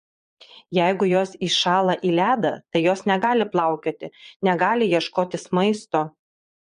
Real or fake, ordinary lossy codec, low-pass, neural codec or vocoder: fake; MP3, 48 kbps; 9.9 kHz; vocoder, 22.05 kHz, 80 mel bands, Vocos